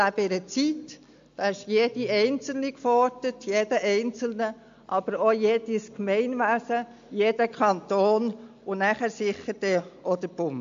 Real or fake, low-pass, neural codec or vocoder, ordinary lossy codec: real; 7.2 kHz; none; AAC, 64 kbps